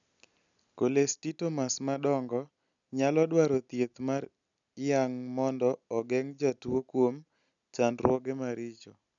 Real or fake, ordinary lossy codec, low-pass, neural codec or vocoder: real; none; 7.2 kHz; none